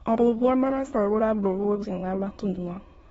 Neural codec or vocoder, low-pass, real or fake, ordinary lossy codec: autoencoder, 22.05 kHz, a latent of 192 numbers a frame, VITS, trained on many speakers; 9.9 kHz; fake; AAC, 24 kbps